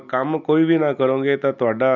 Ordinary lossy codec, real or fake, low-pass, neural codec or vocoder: none; real; 7.2 kHz; none